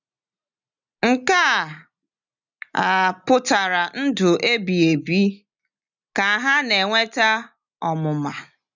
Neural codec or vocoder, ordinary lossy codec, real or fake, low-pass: none; none; real; 7.2 kHz